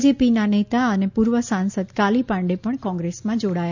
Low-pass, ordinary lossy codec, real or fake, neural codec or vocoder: 7.2 kHz; none; real; none